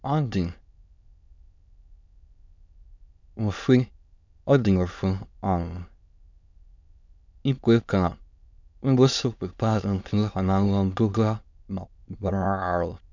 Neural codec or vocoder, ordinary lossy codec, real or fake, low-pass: autoencoder, 22.05 kHz, a latent of 192 numbers a frame, VITS, trained on many speakers; none; fake; 7.2 kHz